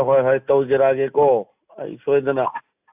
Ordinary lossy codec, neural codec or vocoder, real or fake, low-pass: AAC, 32 kbps; none; real; 3.6 kHz